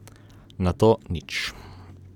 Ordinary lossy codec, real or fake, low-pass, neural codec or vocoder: none; real; 19.8 kHz; none